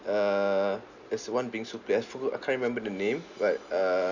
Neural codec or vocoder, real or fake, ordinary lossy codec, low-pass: none; real; none; 7.2 kHz